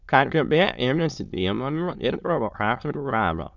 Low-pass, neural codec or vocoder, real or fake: 7.2 kHz; autoencoder, 22.05 kHz, a latent of 192 numbers a frame, VITS, trained on many speakers; fake